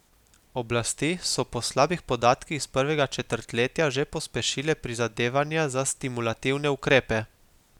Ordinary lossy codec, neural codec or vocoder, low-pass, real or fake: none; none; 19.8 kHz; real